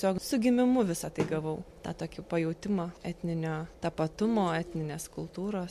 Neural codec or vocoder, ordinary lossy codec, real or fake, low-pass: none; MP3, 64 kbps; real; 14.4 kHz